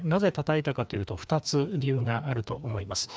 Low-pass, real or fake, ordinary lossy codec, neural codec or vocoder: none; fake; none; codec, 16 kHz, 2 kbps, FreqCodec, larger model